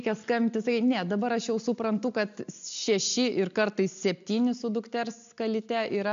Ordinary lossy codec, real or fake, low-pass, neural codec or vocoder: MP3, 64 kbps; real; 7.2 kHz; none